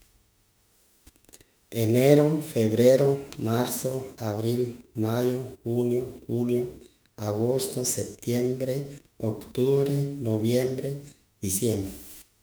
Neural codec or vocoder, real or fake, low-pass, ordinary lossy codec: autoencoder, 48 kHz, 32 numbers a frame, DAC-VAE, trained on Japanese speech; fake; none; none